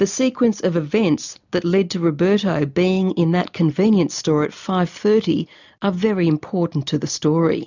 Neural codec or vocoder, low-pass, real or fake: none; 7.2 kHz; real